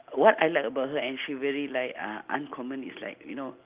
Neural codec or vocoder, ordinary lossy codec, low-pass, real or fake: none; Opus, 32 kbps; 3.6 kHz; real